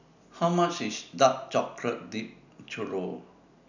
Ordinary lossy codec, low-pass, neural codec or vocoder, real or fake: none; 7.2 kHz; none; real